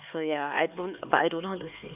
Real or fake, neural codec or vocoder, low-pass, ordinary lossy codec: fake; codec, 16 kHz, 4 kbps, X-Codec, HuBERT features, trained on LibriSpeech; 3.6 kHz; none